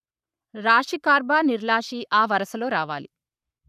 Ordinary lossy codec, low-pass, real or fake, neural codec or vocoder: none; 14.4 kHz; fake; codec, 44.1 kHz, 7.8 kbps, Pupu-Codec